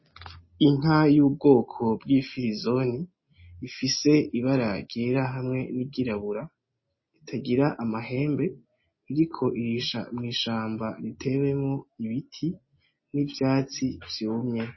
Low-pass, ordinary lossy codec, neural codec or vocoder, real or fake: 7.2 kHz; MP3, 24 kbps; none; real